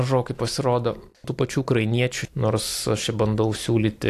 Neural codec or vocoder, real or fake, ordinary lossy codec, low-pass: none; real; AAC, 64 kbps; 14.4 kHz